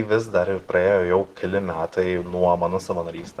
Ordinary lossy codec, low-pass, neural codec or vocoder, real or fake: Opus, 16 kbps; 14.4 kHz; none; real